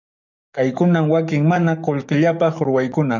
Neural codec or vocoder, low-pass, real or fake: codec, 44.1 kHz, 7.8 kbps, DAC; 7.2 kHz; fake